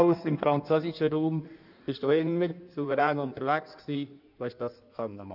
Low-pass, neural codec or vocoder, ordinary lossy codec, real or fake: 5.4 kHz; codec, 16 kHz in and 24 kHz out, 1.1 kbps, FireRedTTS-2 codec; none; fake